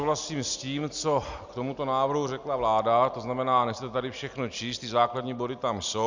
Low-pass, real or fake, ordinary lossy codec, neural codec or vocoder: 7.2 kHz; real; Opus, 64 kbps; none